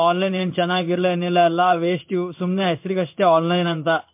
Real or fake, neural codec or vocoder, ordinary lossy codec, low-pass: fake; codec, 16 kHz in and 24 kHz out, 1 kbps, XY-Tokenizer; MP3, 32 kbps; 3.6 kHz